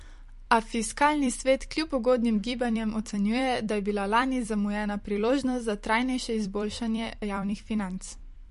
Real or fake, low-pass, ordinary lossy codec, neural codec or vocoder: fake; 14.4 kHz; MP3, 48 kbps; vocoder, 44.1 kHz, 128 mel bands every 256 samples, BigVGAN v2